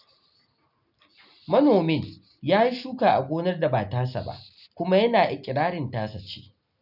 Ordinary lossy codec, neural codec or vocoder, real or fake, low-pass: none; none; real; 5.4 kHz